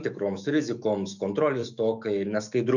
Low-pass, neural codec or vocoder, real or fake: 7.2 kHz; autoencoder, 48 kHz, 128 numbers a frame, DAC-VAE, trained on Japanese speech; fake